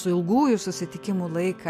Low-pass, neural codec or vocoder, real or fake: 14.4 kHz; none; real